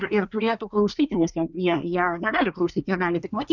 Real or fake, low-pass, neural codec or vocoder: fake; 7.2 kHz; codec, 24 kHz, 1 kbps, SNAC